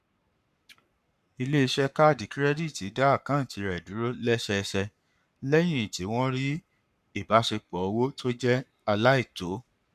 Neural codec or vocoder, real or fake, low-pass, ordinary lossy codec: codec, 44.1 kHz, 7.8 kbps, Pupu-Codec; fake; 14.4 kHz; none